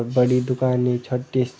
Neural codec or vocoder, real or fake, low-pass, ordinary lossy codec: none; real; none; none